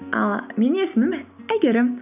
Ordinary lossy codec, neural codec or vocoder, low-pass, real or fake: none; none; 3.6 kHz; real